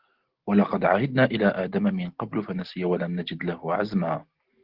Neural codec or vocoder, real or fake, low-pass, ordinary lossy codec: none; real; 5.4 kHz; Opus, 16 kbps